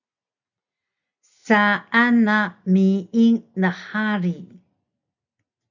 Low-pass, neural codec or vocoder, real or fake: 7.2 kHz; none; real